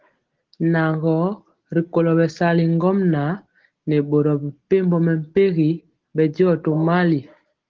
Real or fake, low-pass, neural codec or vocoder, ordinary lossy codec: real; 7.2 kHz; none; Opus, 16 kbps